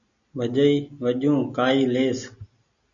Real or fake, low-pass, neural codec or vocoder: real; 7.2 kHz; none